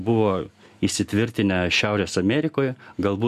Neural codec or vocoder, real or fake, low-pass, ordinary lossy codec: none; real; 14.4 kHz; MP3, 96 kbps